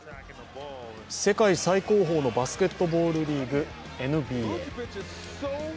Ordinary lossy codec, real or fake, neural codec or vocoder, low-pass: none; real; none; none